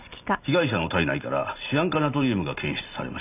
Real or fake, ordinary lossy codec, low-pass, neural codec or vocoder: real; none; 3.6 kHz; none